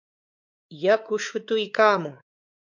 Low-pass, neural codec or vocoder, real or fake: 7.2 kHz; codec, 16 kHz, 4 kbps, X-Codec, WavLM features, trained on Multilingual LibriSpeech; fake